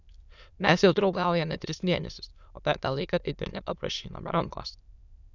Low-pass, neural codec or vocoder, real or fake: 7.2 kHz; autoencoder, 22.05 kHz, a latent of 192 numbers a frame, VITS, trained on many speakers; fake